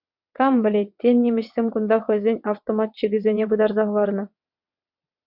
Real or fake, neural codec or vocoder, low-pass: fake; vocoder, 22.05 kHz, 80 mel bands, WaveNeXt; 5.4 kHz